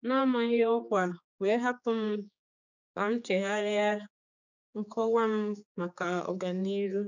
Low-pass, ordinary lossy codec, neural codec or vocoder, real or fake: 7.2 kHz; none; codec, 16 kHz, 4 kbps, X-Codec, HuBERT features, trained on general audio; fake